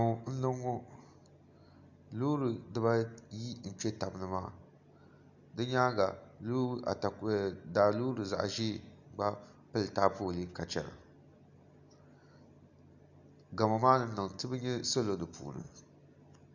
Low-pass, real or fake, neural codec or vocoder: 7.2 kHz; real; none